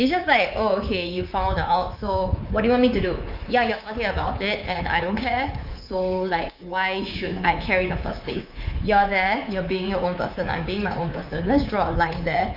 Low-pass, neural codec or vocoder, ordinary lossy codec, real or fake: 5.4 kHz; codec, 24 kHz, 3.1 kbps, DualCodec; Opus, 24 kbps; fake